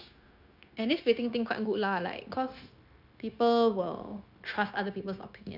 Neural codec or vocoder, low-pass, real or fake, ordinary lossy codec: codec, 16 kHz, 0.9 kbps, LongCat-Audio-Codec; 5.4 kHz; fake; none